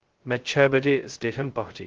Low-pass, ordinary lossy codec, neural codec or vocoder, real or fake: 7.2 kHz; Opus, 16 kbps; codec, 16 kHz, 0.2 kbps, FocalCodec; fake